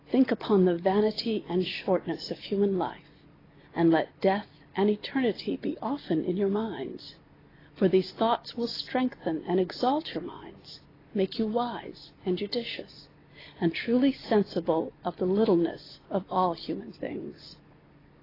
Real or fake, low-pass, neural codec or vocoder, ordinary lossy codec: real; 5.4 kHz; none; AAC, 24 kbps